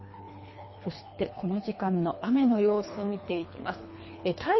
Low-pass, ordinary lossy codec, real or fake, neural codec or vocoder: 7.2 kHz; MP3, 24 kbps; fake; codec, 24 kHz, 3 kbps, HILCodec